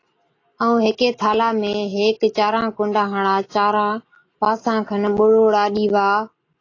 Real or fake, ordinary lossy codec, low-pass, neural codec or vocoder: real; AAC, 32 kbps; 7.2 kHz; none